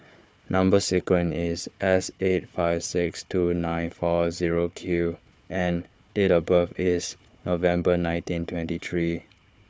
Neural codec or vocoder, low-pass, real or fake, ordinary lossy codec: codec, 16 kHz, 4 kbps, FunCodec, trained on Chinese and English, 50 frames a second; none; fake; none